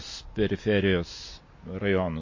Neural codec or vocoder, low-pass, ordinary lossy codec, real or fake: none; 7.2 kHz; MP3, 32 kbps; real